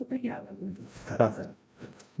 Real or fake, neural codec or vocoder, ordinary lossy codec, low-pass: fake; codec, 16 kHz, 0.5 kbps, FreqCodec, larger model; none; none